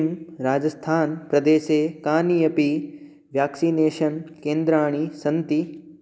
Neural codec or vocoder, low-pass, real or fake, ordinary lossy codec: none; none; real; none